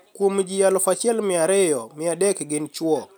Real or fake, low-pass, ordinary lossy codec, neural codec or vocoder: real; none; none; none